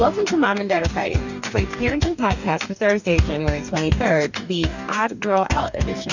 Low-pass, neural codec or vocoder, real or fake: 7.2 kHz; codec, 44.1 kHz, 2.6 kbps, DAC; fake